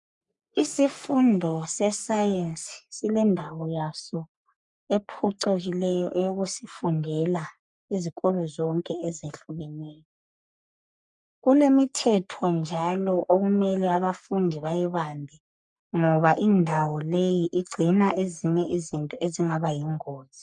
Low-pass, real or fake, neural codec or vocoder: 10.8 kHz; fake; codec, 44.1 kHz, 3.4 kbps, Pupu-Codec